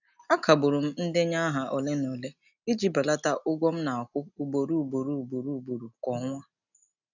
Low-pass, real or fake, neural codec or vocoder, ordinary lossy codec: 7.2 kHz; real; none; none